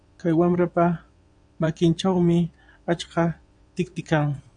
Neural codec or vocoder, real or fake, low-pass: vocoder, 22.05 kHz, 80 mel bands, Vocos; fake; 9.9 kHz